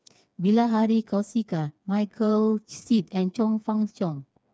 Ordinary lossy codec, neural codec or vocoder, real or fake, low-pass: none; codec, 16 kHz, 4 kbps, FreqCodec, smaller model; fake; none